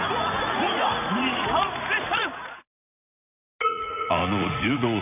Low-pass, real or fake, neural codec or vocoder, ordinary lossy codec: 3.6 kHz; fake; vocoder, 44.1 kHz, 80 mel bands, Vocos; none